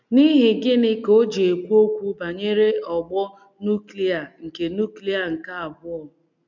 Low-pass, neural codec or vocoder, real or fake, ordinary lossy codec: 7.2 kHz; none; real; none